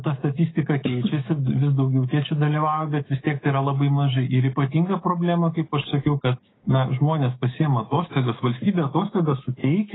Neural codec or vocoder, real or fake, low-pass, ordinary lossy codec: codec, 16 kHz, 6 kbps, DAC; fake; 7.2 kHz; AAC, 16 kbps